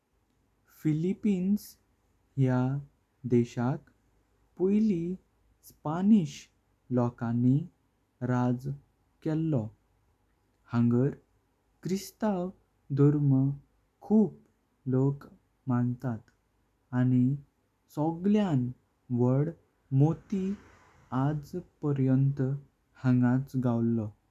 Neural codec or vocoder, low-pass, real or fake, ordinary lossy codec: none; 14.4 kHz; real; none